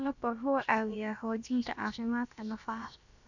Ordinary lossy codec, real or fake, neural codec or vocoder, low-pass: none; fake; codec, 16 kHz, about 1 kbps, DyCAST, with the encoder's durations; 7.2 kHz